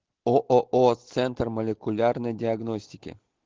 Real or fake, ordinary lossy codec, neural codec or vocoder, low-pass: real; Opus, 16 kbps; none; 7.2 kHz